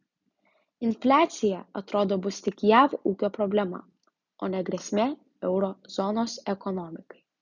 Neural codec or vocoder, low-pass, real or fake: none; 7.2 kHz; real